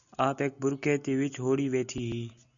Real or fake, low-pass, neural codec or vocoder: real; 7.2 kHz; none